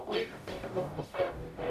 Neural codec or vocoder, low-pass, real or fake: codec, 44.1 kHz, 0.9 kbps, DAC; 14.4 kHz; fake